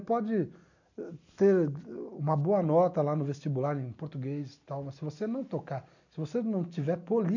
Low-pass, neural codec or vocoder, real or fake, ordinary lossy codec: 7.2 kHz; none; real; none